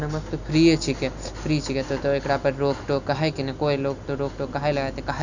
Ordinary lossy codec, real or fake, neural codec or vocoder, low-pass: none; real; none; 7.2 kHz